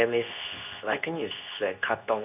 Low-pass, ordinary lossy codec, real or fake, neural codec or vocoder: 3.6 kHz; none; fake; codec, 24 kHz, 0.9 kbps, WavTokenizer, medium speech release version 2